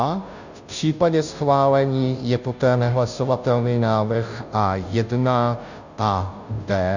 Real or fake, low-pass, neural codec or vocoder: fake; 7.2 kHz; codec, 16 kHz, 0.5 kbps, FunCodec, trained on Chinese and English, 25 frames a second